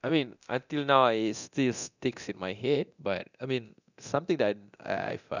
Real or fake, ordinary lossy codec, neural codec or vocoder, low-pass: fake; none; codec, 24 kHz, 0.9 kbps, DualCodec; 7.2 kHz